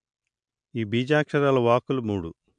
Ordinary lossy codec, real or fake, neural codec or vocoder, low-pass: MP3, 64 kbps; real; none; 9.9 kHz